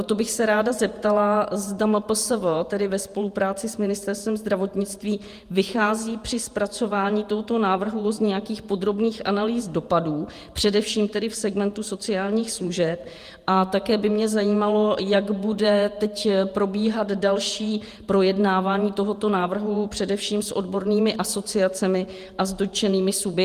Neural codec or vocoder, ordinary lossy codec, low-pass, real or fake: vocoder, 48 kHz, 128 mel bands, Vocos; Opus, 32 kbps; 14.4 kHz; fake